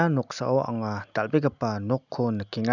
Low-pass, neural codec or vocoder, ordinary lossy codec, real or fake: 7.2 kHz; none; none; real